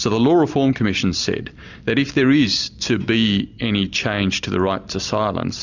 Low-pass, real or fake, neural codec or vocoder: 7.2 kHz; real; none